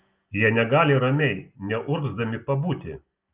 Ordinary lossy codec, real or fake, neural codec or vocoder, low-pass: Opus, 32 kbps; real; none; 3.6 kHz